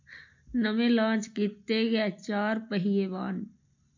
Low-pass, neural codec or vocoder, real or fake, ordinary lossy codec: 7.2 kHz; none; real; MP3, 48 kbps